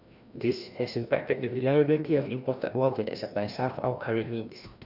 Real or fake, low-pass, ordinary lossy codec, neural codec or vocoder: fake; 5.4 kHz; none; codec, 16 kHz, 1 kbps, FreqCodec, larger model